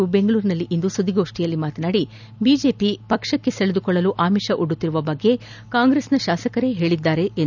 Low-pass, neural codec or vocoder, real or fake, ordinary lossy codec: 7.2 kHz; none; real; none